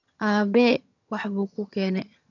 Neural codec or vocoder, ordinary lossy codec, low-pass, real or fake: vocoder, 22.05 kHz, 80 mel bands, HiFi-GAN; none; 7.2 kHz; fake